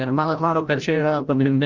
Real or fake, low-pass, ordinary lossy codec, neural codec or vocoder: fake; 7.2 kHz; Opus, 32 kbps; codec, 16 kHz, 0.5 kbps, FreqCodec, larger model